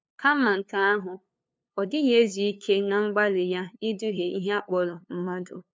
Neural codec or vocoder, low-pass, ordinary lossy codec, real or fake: codec, 16 kHz, 2 kbps, FunCodec, trained on LibriTTS, 25 frames a second; none; none; fake